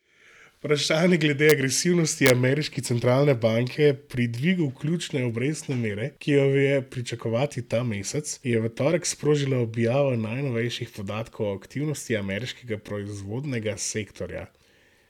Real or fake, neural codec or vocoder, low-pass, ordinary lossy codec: real; none; 19.8 kHz; none